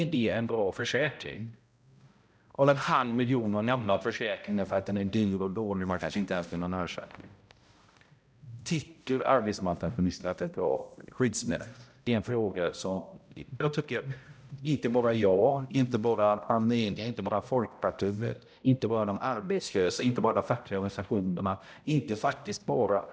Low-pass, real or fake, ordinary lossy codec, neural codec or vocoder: none; fake; none; codec, 16 kHz, 0.5 kbps, X-Codec, HuBERT features, trained on balanced general audio